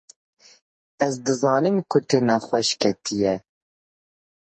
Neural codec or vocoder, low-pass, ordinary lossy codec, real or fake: codec, 44.1 kHz, 2.6 kbps, SNAC; 10.8 kHz; MP3, 32 kbps; fake